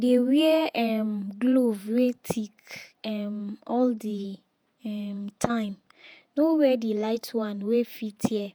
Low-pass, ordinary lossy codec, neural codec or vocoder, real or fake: none; none; vocoder, 48 kHz, 128 mel bands, Vocos; fake